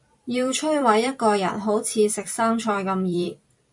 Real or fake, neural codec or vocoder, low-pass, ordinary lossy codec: fake; vocoder, 44.1 kHz, 128 mel bands every 256 samples, BigVGAN v2; 10.8 kHz; MP3, 64 kbps